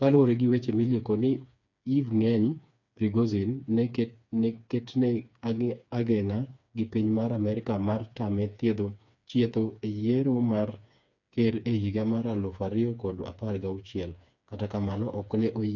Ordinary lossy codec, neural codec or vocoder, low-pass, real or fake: none; codec, 16 kHz, 4 kbps, FreqCodec, smaller model; 7.2 kHz; fake